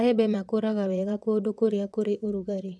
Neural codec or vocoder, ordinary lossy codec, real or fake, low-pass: vocoder, 22.05 kHz, 80 mel bands, WaveNeXt; none; fake; none